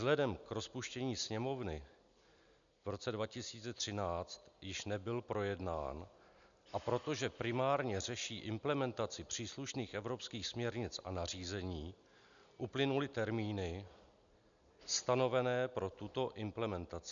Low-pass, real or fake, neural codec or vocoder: 7.2 kHz; real; none